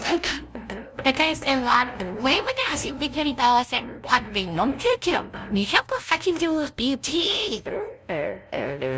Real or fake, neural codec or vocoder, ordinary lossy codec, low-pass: fake; codec, 16 kHz, 0.5 kbps, FunCodec, trained on LibriTTS, 25 frames a second; none; none